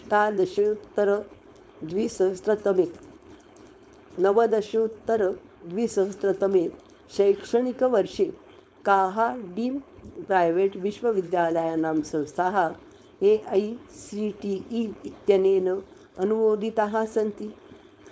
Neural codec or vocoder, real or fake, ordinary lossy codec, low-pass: codec, 16 kHz, 4.8 kbps, FACodec; fake; none; none